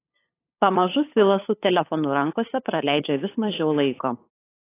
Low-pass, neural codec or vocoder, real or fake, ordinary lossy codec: 3.6 kHz; codec, 16 kHz, 8 kbps, FunCodec, trained on LibriTTS, 25 frames a second; fake; AAC, 24 kbps